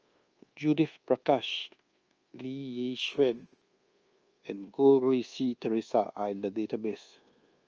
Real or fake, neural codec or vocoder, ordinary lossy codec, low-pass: fake; codec, 24 kHz, 1.2 kbps, DualCodec; Opus, 24 kbps; 7.2 kHz